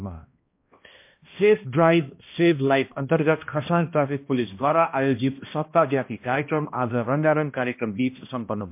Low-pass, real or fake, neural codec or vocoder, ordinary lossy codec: 3.6 kHz; fake; codec, 16 kHz, 1 kbps, X-Codec, HuBERT features, trained on balanced general audio; MP3, 32 kbps